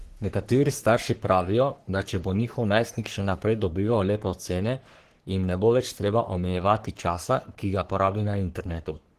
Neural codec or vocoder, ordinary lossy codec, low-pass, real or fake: codec, 44.1 kHz, 3.4 kbps, Pupu-Codec; Opus, 16 kbps; 14.4 kHz; fake